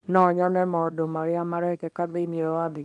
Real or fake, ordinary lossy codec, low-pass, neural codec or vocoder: fake; MP3, 96 kbps; 10.8 kHz; codec, 24 kHz, 0.9 kbps, WavTokenizer, small release